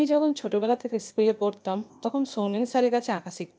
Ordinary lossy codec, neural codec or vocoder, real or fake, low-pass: none; codec, 16 kHz, 0.8 kbps, ZipCodec; fake; none